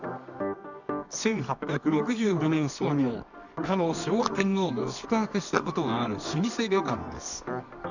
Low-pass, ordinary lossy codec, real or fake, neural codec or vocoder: 7.2 kHz; none; fake; codec, 24 kHz, 0.9 kbps, WavTokenizer, medium music audio release